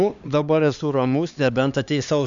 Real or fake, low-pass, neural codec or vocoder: fake; 7.2 kHz; codec, 16 kHz, 2 kbps, X-Codec, HuBERT features, trained on LibriSpeech